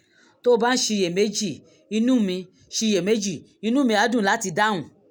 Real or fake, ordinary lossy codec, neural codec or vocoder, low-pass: real; none; none; 19.8 kHz